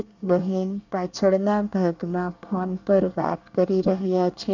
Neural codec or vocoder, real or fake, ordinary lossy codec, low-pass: codec, 24 kHz, 1 kbps, SNAC; fake; none; 7.2 kHz